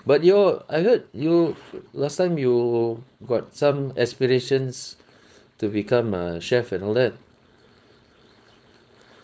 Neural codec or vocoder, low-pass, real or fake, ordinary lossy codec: codec, 16 kHz, 4.8 kbps, FACodec; none; fake; none